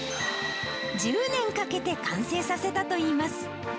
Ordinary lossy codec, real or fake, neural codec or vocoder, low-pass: none; real; none; none